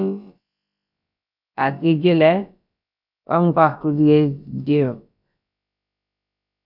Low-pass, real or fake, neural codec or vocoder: 5.4 kHz; fake; codec, 16 kHz, about 1 kbps, DyCAST, with the encoder's durations